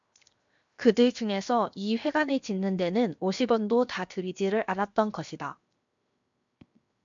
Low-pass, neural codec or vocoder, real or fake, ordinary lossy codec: 7.2 kHz; codec, 16 kHz, 0.7 kbps, FocalCodec; fake; MP3, 64 kbps